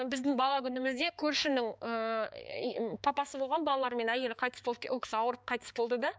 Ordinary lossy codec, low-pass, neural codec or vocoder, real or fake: none; none; codec, 16 kHz, 4 kbps, X-Codec, HuBERT features, trained on balanced general audio; fake